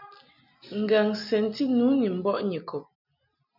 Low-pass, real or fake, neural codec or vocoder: 5.4 kHz; real; none